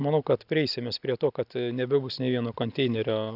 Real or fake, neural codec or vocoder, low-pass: real; none; 5.4 kHz